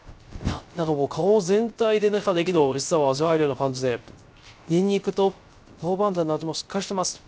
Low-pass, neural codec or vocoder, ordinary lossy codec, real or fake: none; codec, 16 kHz, 0.3 kbps, FocalCodec; none; fake